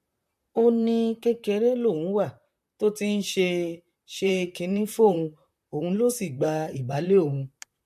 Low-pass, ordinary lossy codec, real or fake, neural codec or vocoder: 14.4 kHz; MP3, 64 kbps; fake; vocoder, 44.1 kHz, 128 mel bands, Pupu-Vocoder